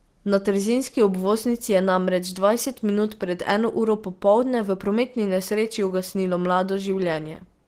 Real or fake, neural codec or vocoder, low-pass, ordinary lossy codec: real; none; 14.4 kHz; Opus, 16 kbps